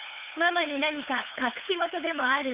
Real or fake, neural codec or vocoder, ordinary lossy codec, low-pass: fake; codec, 16 kHz, 8 kbps, FunCodec, trained on LibriTTS, 25 frames a second; Opus, 32 kbps; 3.6 kHz